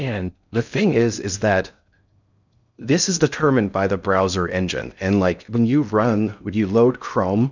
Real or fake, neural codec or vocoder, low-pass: fake; codec, 16 kHz in and 24 kHz out, 0.6 kbps, FocalCodec, streaming, 2048 codes; 7.2 kHz